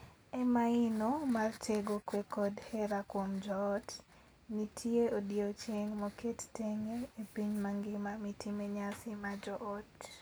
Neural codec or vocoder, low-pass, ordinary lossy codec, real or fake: none; none; none; real